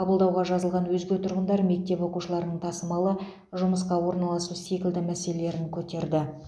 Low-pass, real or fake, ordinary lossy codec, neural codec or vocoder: none; real; none; none